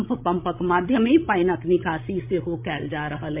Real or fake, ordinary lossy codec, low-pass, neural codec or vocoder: fake; MP3, 32 kbps; 3.6 kHz; codec, 16 kHz, 16 kbps, FreqCodec, larger model